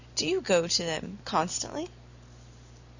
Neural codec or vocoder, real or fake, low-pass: none; real; 7.2 kHz